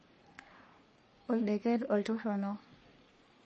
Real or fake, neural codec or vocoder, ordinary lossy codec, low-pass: fake; codec, 44.1 kHz, 3.4 kbps, Pupu-Codec; MP3, 32 kbps; 10.8 kHz